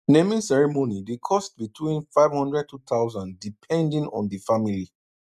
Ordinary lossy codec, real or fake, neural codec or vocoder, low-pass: none; real; none; 14.4 kHz